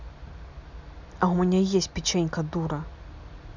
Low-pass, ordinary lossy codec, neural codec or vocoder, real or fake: 7.2 kHz; none; none; real